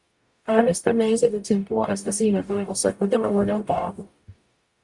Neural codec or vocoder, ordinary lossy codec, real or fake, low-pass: codec, 44.1 kHz, 0.9 kbps, DAC; Opus, 64 kbps; fake; 10.8 kHz